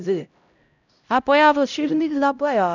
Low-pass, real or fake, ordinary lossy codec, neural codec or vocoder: 7.2 kHz; fake; none; codec, 16 kHz, 0.5 kbps, X-Codec, HuBERT features, trained on LibriSpeech